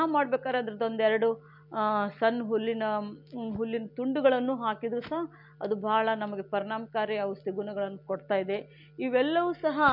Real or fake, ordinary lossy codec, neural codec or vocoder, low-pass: real; MP3, 48 kbps; none; 5.4 kHz